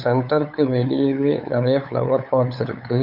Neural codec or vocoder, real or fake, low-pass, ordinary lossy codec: codec, 16 kHz, 8 kbps, FunCodec, trained on LibriTTS, 25 frames a second; fake; 5.4 kHz; none